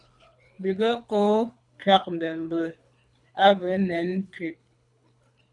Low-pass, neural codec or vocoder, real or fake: 10.8 kHz; codec, 24 kHz, 3 kbps, HILCodec; fake